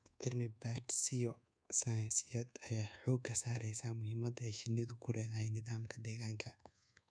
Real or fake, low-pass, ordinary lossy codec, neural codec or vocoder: fake; 9.9 kHz; none; codec, 24 kHz, 1.2 kbps, DualCodec